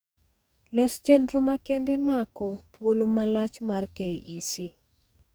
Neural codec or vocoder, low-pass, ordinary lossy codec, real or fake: codec, 44.1 kHz, 2.6 kbps, DAC; none; none; fake